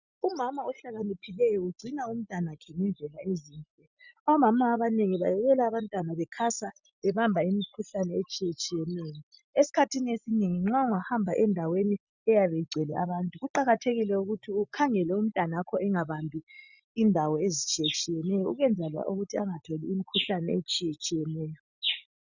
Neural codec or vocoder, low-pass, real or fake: none; 7.2 kHz; real